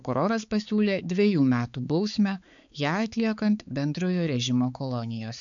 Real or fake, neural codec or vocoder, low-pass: fake; codec, 16 kHz, 4 kbps, X-Codec, HuBERT features, trained on balanced general audio; 7.2 kHz